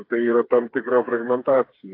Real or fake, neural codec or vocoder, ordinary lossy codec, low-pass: fake; codec, 16 kHz, 4 kbps, FreqCodec, smaller model; AAC, 32 kbps; 5.4 kHz